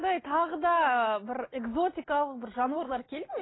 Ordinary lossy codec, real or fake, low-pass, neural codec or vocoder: AAC, 16 kbps; real; 7.2 kHz; none